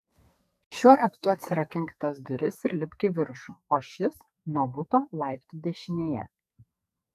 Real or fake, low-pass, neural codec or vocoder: fake; 14.4 kHz; codec, 44.1 kHz, 2.6 kbps, SNAC